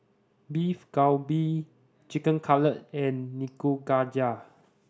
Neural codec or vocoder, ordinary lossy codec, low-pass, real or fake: none; none; none; real